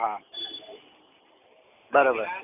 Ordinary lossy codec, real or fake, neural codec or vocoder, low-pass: none; real; none; 3.6 kHz